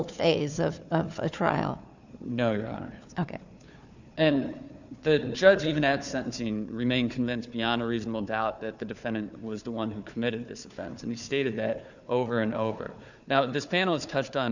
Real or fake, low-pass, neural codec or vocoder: fake; 7.2 kHz; codec, 16 kHz, 4 kbps, FunCodec, trained on Chinese and English, 50 frames a second